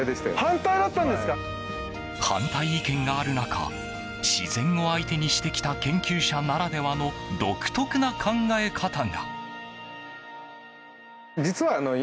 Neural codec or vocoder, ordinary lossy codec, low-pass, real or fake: none; none; none; real